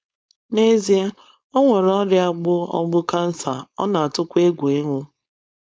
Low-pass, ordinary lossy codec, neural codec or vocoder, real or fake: none; none; codec, 16 kHz, 4.8 kbps, FACodec; fake